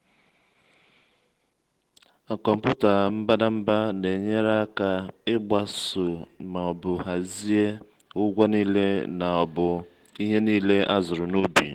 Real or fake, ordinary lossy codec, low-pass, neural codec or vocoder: real; Opus, 16 kbps; 19.8 kHz; none